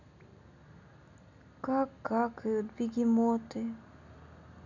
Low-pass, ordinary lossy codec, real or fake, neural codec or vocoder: 7.2 kHz; none; real; none